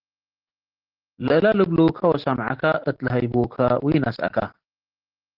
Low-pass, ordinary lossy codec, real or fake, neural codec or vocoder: 5.4 kHz; Opus, 16 kbps; real; none